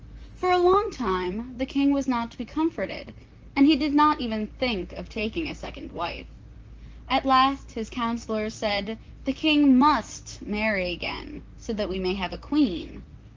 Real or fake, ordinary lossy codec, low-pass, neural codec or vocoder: fake; Opus, 24 kbps; 7.2 kHz; vocoder, 44.1 kHz, 128 mel bands, Pupu-Vocoder